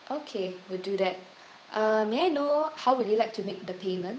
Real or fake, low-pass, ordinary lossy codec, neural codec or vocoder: fake; none; none; codec, 16 kHz, 8 kbps, FunCodec, trained on Chinese and English, 25 frames a second